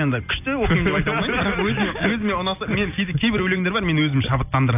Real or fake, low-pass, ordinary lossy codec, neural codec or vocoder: real; 3.6 kHz; none; none